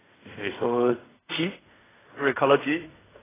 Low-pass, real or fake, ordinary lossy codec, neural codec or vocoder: 3.6 kHz; fake; AAC, 16 kbps; codec, 16 kHz in and 24 kHz out, 0.4 kbps, LongCat-Audio-Codec, fine tuned four codebook decoder